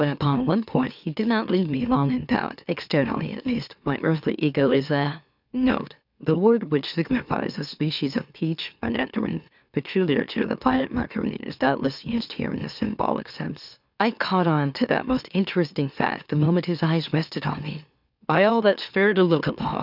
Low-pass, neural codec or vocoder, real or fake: 5.4 kHz; autoencoder, 44.1 kHz, a latent of 192 numbers a frame, MeloTTS; fake